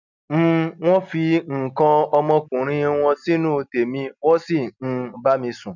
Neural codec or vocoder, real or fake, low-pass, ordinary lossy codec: none; real; 7.2 kHz; none